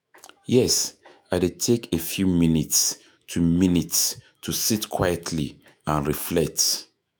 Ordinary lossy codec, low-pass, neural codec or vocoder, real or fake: none; none; autoencoder, 48 kHz, 128 numbers a frame, DAC-VAE, trained on Japanese speech; fake